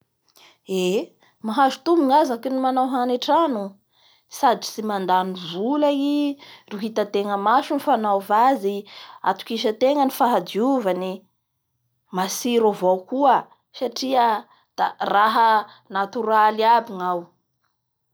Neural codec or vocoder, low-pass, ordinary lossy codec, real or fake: none; none; none; real